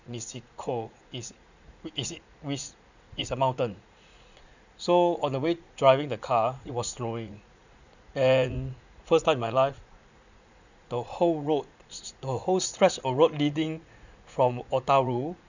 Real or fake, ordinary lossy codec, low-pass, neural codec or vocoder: fake; none; 7.2 kHz; vocoder, 44.1 kHz, 80 mel bands, Vocos